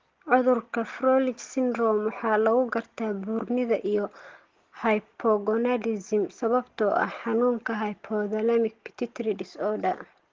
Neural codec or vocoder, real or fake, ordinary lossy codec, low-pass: none; real; Opus, 16 kbps; 7.2 kHz